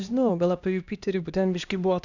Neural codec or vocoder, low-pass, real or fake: codec, 16 kHz, 1 kbps, X-Codec, HuBERT features, trained on LibriSpeech; 7.2 kHz; fake